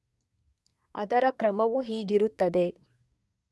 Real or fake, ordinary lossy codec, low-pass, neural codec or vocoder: fake; none; none; codec, 24 kHz, 1 kbps, SNAC